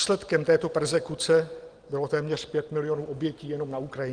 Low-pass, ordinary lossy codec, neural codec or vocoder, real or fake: 9.9 kHz; Opus, 24 kbps; none; real